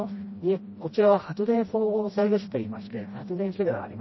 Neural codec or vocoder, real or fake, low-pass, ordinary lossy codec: codec, 16 kHz, 1 kbps, FreqCodec, smaller model; fake; 7.2 kHz; MP3, 24 kbps